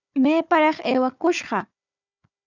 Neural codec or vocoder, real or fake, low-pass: codec, 16 kHz, 4 kbps, FunCodec, trained on Chinese and English, 50 frames a second; fake; 7.2 kHz